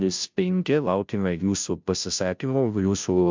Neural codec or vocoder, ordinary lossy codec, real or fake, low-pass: codec, 16 kHz, 0.5 kbps, FunCodec, trained on Chinese and English, 25 frames a second; MP3, 64 kbps; fake; 7.2 kHz